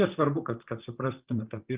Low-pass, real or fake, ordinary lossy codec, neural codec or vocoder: 3.6 kHz; fake; Opus, 32 kbps; vocoder, 44.1 kHz, 128 mel bands, Pupu-Vocoder